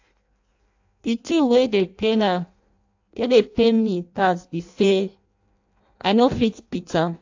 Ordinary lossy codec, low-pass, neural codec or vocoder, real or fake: AAC, 48 kbps; 7.2 kHz; codec, 16 kHz in and 24 kHz out, 0.6 kbps, FireRedTTS-2 codec; fake